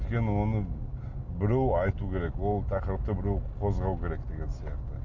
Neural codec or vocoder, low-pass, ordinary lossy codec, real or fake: none; 7.2 kHz; none; real